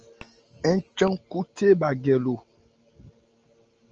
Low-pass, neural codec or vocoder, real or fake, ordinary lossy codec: 7.2 kHz; none; real; Opus, 24 kbps